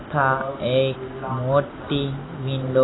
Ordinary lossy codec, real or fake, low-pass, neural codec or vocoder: AAC, 16 kbps; real; 7.2 kHz; none